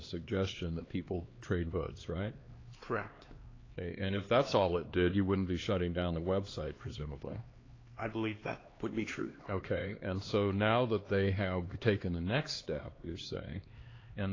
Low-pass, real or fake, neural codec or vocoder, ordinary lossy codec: 7.2 kHz; fake; codec, 16 kHz, 2 kbps, X-Codec, HuBERT features, trained on LibriSpeech; AAC, 32 kbps